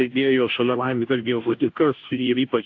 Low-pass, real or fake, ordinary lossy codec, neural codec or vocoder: 7.2 kHz; fake; AAC, 48 kbps; codec, 16 kHz, 0.5 kbps, FunCodec, trained on Chinese and English, 25 frames a second